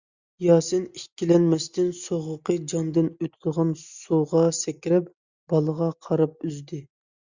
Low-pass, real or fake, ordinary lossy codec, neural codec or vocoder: 7.2 kHz; real; Opus, 64 kbps; none